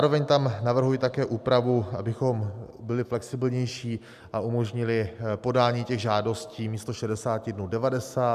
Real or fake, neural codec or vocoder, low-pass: real; none; 14.4 kHz